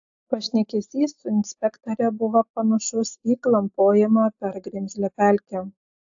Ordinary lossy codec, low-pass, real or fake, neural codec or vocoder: AAC, 64 kbps; 7.2 kHz; real; none